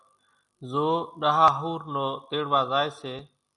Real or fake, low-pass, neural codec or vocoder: real; 10.8 kHz; none